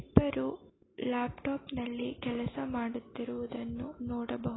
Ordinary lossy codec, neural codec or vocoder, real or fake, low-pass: AAC, 16 kbps; none; real; 7.2 kHz